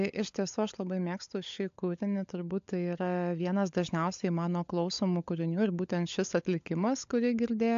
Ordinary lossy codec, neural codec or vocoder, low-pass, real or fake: AAC, 64 kbps; codec, 16 kHz, 16 kbps, FunCodec, trained on LibriTTS, 50 frames a second; 7.2 kHz; fake